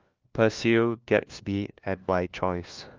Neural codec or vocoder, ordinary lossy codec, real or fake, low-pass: codec, 16 kHz, 1 kbps, FunCodec, trained on LibriTTS, 50 frames a second; Opus, 24 kbps; fake; 7.2 kHz